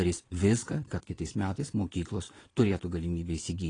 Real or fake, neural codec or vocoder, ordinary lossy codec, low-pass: fake; vocoder, 22.05 kHz, 80 mel bands, Vocos; AAC, 32 kbps; 9.9 kHz